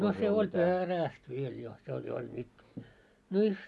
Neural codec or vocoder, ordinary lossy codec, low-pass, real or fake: none; none; none; real